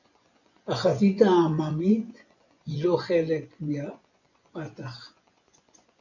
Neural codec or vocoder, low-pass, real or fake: vocoder, 24 kHz, 100 mel bands, Vocos; 7.2 kHz; fake